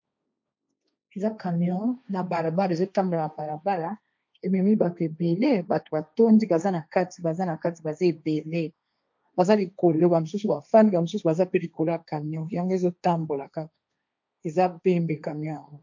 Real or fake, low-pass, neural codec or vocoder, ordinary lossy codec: fake; 7.2 kHz; codec, 16 kHz, 1.1 kbps, Voila-Tokenizer; MP3, 48 kbps